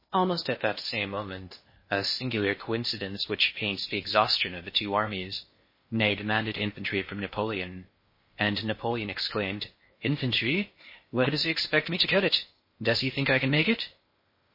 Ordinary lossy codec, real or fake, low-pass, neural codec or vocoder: MP3, 24 kbps; fake; 5.4 kHz; codec, 16 kHz in and 24 kHz out, 0.6 kbps, FocalCodec, streaming, 2048 codes